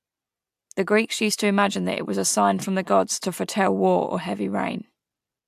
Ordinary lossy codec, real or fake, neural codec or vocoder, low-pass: AAC, 96 kbps; real; none; 14.4 kHz